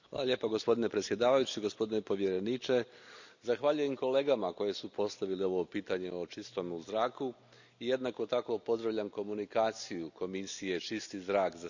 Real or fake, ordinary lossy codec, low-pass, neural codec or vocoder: real; none; 7.2 kHz; none